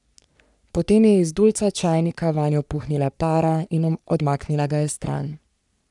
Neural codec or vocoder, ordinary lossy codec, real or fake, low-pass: codec, 44.1 kHz, 3.4 kbps, Pupu-Codec; none; fake; 10.8 kHz